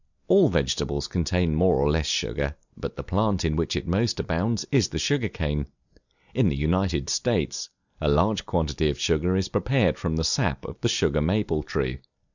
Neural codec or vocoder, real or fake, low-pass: none; real; 7.2 kHz